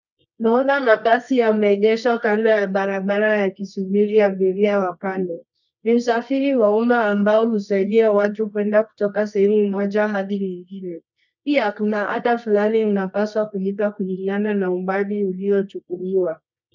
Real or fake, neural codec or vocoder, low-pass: fake; codec, 24 kHz, 0.9 kbps, WavTokenizer, medium music audio release; 7.2 kHz